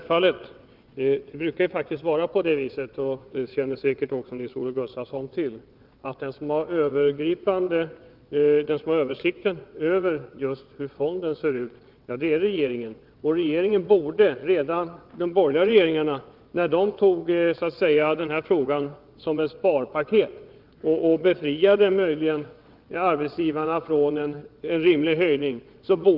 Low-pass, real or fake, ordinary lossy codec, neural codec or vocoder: 5.4 kHz; fake; Opus, 24 kbps; vocoder, 22.05 kHz, 80 mel bands, WaveNeXt